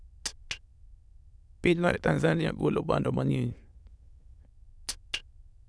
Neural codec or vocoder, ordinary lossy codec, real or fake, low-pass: autoencoder, 22.05 kHz, a latent of 192 numbers a frame, VITS, trained on many speakers; none; fake; none